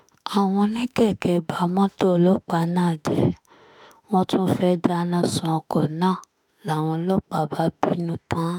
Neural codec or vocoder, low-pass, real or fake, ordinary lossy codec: autoencoder, 48 kHz, 32 numbers a frame, DAC-VAE, trained on Japanese speech; 19.8 kHz; fake; none